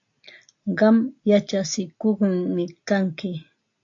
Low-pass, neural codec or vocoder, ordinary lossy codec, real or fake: 7.2 kHz; none; AAC, 48 kbps; real